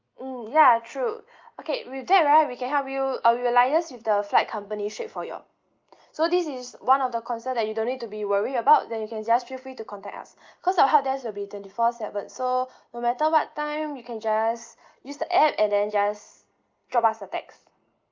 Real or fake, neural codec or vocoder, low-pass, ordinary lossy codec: real; none; 7.2 kHz; Opus, 24 kbps